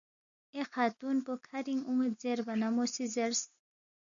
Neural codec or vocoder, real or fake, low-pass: none; real; 7.2 kHz